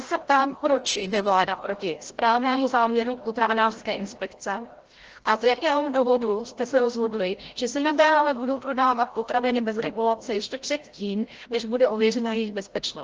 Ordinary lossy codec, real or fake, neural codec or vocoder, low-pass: Opus, 16 kbps; fake; codec, 16 kHz, 0.5 kbps, FreqCodec, larger model; 7.2 kHz